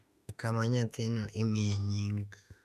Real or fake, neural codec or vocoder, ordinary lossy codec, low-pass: fake; autoencoder, 48 kHz, 32 numbers a frame, DAC-VAE, trained on Japanese speech; none; 14.4 kHz